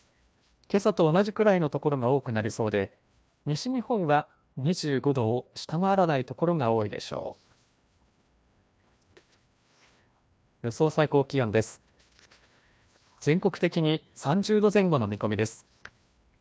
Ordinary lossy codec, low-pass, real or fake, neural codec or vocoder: none; none; fake; codec, 16 kHz, 1 kbps, FreqCodec, larger model